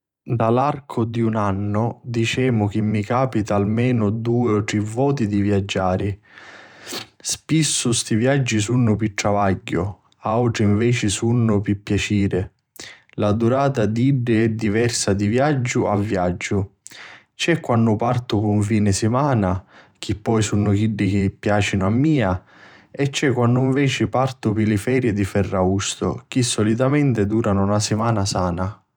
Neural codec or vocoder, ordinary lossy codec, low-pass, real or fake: vocoder, 44.1 kHz, 128 mel bands every 512 samples, BigVGAN v2; none; 19.8 kHz; fake